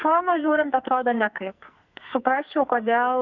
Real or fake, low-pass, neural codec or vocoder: fake; 7.2 kHz; codec, 44.1 kHz, 2.6 kbps, SNAC